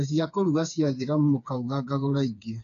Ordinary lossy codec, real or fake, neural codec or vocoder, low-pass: none; fake; codec, 16 kHz, 4 kbps, FreqCodec, smaller model; 7.2 kHz